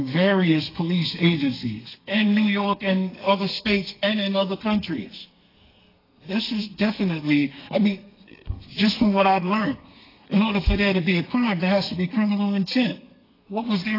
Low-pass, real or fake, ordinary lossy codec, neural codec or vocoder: 5.4 kHz; fake; AAC, 24 kbps; codec, 32 kHz, 1.9 kbps, SNAC